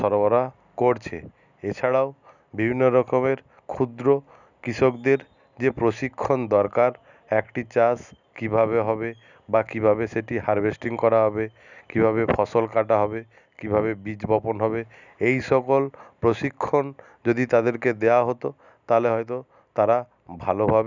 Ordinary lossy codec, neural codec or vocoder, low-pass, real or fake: none; none; 7.2 kHz; real